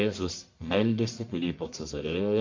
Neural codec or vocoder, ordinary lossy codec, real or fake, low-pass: codec, 44.1 kHz, 1.7 kbps, Pupu-Codec; MP3, 48 kbps; fake; 7.2 kHz